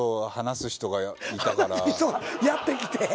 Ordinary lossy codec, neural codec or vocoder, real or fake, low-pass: none; none; real; none